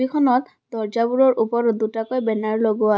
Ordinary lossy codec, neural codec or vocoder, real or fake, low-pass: none; none; real; none